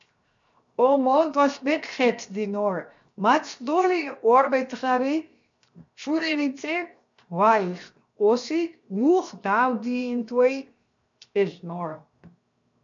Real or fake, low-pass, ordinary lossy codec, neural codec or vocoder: fake; 7.2 kHz; MP3, 64 kbps; codec, 16 kHz, 0.7 kbps, FocalCodec